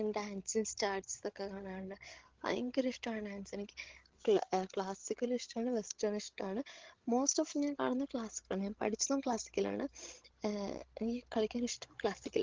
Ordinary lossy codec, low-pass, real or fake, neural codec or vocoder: Opus, 16 kbps; 7.2 kHz; fake; codec, 16 kHz, 16 kbps, FreqCodec, larger model